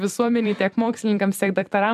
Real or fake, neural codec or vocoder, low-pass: fake; vocoder, 44.1 kHz, 128 mel bands every 256 samples, BigVGAN v2; 14.4 kHz